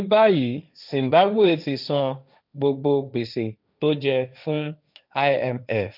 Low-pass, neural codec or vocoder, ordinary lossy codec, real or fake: 5.4 kHz; codec, 16 kHz, 1.1 kbps, Voila-Tokenizer; none; fake